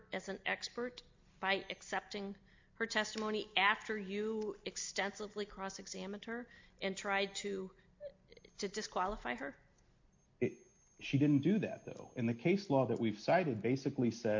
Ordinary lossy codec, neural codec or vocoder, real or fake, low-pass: MP3, 48 kbps; none; real; 7.2 kHz